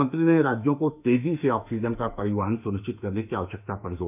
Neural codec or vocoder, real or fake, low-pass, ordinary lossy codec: autoencoder, 48 kHz, 32 numbers a frame, DAC-VAE, trained on Japanese speech; fake; 3.6 kHz; none